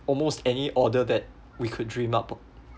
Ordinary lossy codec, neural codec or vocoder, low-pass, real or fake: none; none; none; real